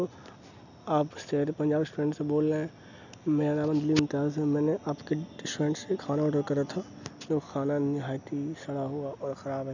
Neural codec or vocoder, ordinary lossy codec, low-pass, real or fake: none; none; 7.2 kHz; real